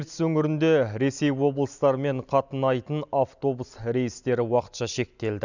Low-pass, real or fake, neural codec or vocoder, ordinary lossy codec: 7.2 kHz; real; none; none